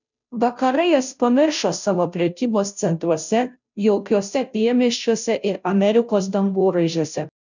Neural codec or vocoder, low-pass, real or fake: codec, 16 kHz, 0.5 kbps, FunCodec, trained on Chinese and English, 25 frames a second; 7.2 kHz; fake